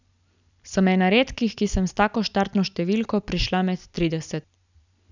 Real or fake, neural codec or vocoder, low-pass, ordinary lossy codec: real; none; 7.2 kHz; none